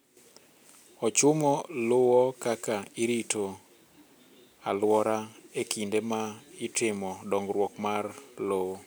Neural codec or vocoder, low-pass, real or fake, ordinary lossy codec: none; none; real; none